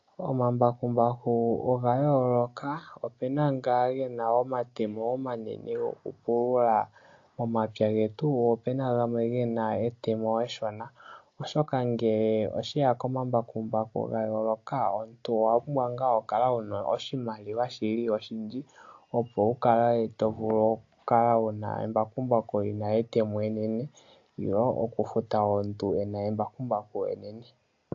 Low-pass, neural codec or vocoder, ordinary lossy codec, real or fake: 7.2 kHz; none; AAC, 48 kbps; real